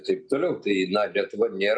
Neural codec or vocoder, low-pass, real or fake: none; 9.9 kHz; real